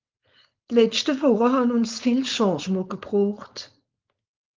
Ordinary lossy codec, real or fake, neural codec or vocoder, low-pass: Opus, 32 kbps; fake; codec, 16 kHz, 4.8 kbps, FACodec; 7.2 kHz